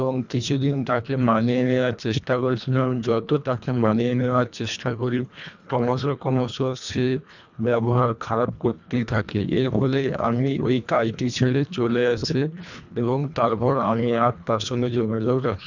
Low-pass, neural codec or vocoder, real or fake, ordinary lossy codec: 7.2 kHz; codec, 24 kHz, 1.5 kbps, HILCodec; fake; none